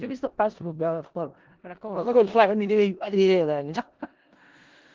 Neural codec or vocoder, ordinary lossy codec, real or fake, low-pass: codec, 16 kHz in and 24 kHz out, 0.4 kbps, LongCat-Audio-Codec, four codebook decoder; Opus, 16 kbps; fake; 7.2 kHz